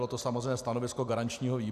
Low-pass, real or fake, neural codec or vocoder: 14.4 kHz; real; none